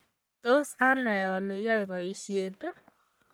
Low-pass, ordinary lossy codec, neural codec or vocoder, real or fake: none; none; codec, 44.1 kHz, 1.7 kbps, Pupu-Codec; fake